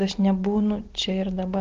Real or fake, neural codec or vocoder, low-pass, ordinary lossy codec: real; none; 7.2 kHz; Opus, 16 kbps